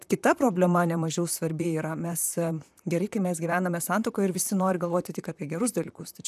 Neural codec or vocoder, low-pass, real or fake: vocoder, 44.1 kHz, 128 mel bands, Pupu-Vocoder; 14.4 kHz; fake